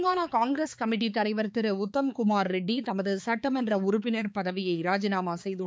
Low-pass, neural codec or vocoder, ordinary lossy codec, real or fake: none; codec, 16 kHz, 4 kbps, X-Codec, HuBERT features, trained on balanced general audio; none; fake